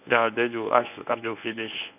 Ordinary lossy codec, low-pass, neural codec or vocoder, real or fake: none; 3.6 kHz; codec, 24 kHz, 0.9 kbps, WavTokenizer, medium speech release version 1; fake